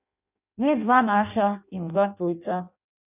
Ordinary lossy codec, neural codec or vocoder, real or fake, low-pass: none; codec, 16 kHz in and 24 kHz out, 0.6 kbps, FireRedTTS-2 codec; fake; 3.6 kHz